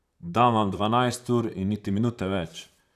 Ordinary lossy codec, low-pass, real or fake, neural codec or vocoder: none; 14.4 kHz; fake; vocoder, 44.1 kHz, 128 mel bands, Pupu-Vocoder